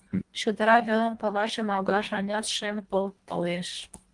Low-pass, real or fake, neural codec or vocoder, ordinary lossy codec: 10.8 kHz; fake; codec, 24 kHz, 1.5 kbps, HILCodec; Opus, 32 kbps